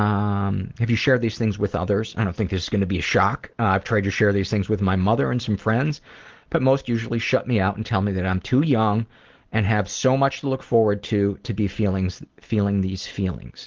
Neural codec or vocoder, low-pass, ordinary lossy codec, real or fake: none; 7.2 kHz; Opus, 16 kbps; real